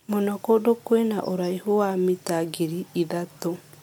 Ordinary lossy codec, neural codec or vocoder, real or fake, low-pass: MP3, 96 kbps; none; real; 19.8 kHz